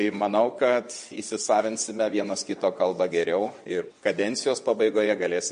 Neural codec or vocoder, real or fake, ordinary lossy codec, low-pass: vocoder, 22.05 kHz, 80 mel bands, WaveNeXt; fake; MP3, 48 kbps; 9.9 kHz